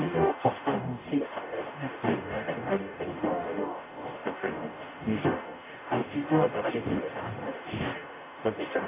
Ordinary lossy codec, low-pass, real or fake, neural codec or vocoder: none; 3.6 kHz; fake; codec, 44.1 kHz, 0.9 kbps, DAC